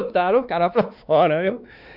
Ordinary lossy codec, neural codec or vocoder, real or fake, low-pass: none; codec, 16 kHz, 2 kbps, X-Codec, WavLM features, trained on Multilingual LibriSpeech; fake; 5.4 kHz